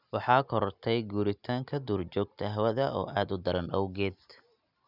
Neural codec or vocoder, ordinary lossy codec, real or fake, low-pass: none; none; real; 5.4 kHz